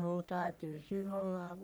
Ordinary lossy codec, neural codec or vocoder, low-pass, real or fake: none; codec, 44.1 kHz, 1.7 kbps, Pupu-Codec; none; fake